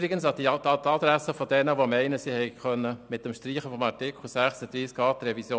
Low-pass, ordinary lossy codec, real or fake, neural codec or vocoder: none; none; real; none